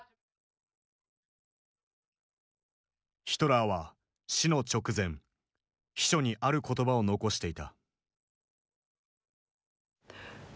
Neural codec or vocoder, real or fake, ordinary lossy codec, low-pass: none; real; none; none